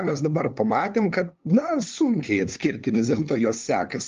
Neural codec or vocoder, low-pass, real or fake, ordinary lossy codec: codec, 16 kHz, 2 kbps, FunCodec, trained on LibriTTS, 25 frames a second; 7.2 kHz; fake; Opus, 32 kbps